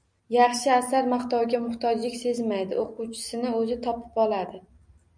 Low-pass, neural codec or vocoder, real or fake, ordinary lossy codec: 9.9 kHz; none; real; MP3, 96 kbps